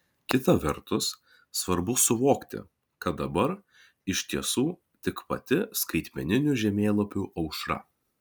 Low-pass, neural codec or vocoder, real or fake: 19.8 kHz; none; real